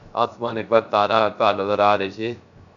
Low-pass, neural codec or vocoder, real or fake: 7.2 kHz; codec, 16 kHz, 0.3 kbps, FocalCodec; fake